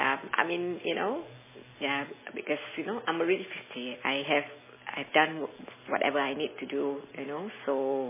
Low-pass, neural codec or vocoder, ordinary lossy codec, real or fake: 3.6 kHz; none; MP3, 16 kbps; real